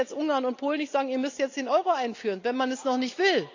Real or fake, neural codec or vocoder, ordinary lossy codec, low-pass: real; none; AAC, 48 kbps; 7.2 kHz